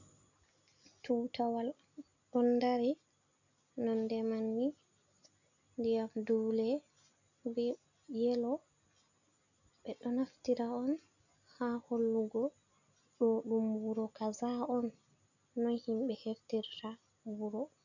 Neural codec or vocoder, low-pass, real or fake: none; 7.2 kHz; real